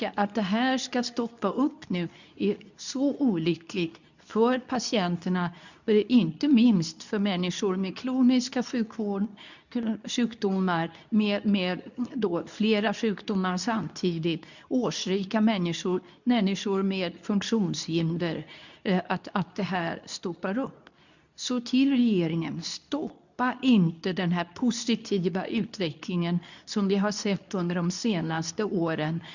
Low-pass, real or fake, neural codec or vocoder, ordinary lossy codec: 7.2 kHz; fake; codec, 24 kHz, 0.9 kbps, WavTokenizer, medium speech release version 2; none